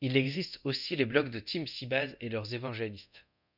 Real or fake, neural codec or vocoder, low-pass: fake; codec, 24 kHz, 0.9 kbps, DualCodec; 5.4 kHz